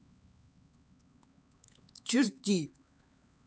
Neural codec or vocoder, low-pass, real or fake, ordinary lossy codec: codec, 16 kHz, 4 kbps, X-Codec, HuBERT features, trained on LibriSpeech; none; fake; none